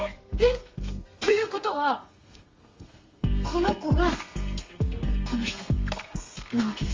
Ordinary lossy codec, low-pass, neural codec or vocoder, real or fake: Opus, 32 kbps; 7.2 kHz; codec, 32 kHz, 1.9 kbps, SNAC; fake